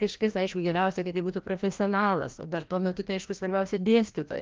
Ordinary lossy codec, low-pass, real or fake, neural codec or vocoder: Opus, 24 kbps; 7.2 kHz; fake; codec, 16 kHz, 1 kbps, FreqCodec, larger model